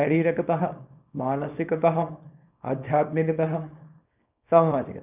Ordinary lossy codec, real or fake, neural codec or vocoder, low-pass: none; fake; codec, 24 kHz, 0.9 kbps, WavTokenizer, small release; 3.6 kHz